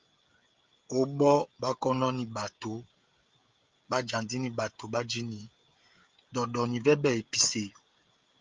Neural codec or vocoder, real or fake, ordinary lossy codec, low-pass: codec, 16 kHz, 16 kbps, FreqCodec, smaller model; fake; Opus, 32 kbps; 7.2 kHz